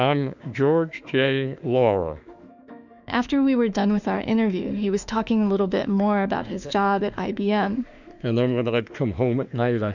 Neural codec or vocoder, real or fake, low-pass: autoencoder, 48 kHz, 32 numbers a frame, DAC-VAE, trained on Japanese speech; fake; 7.2 kHz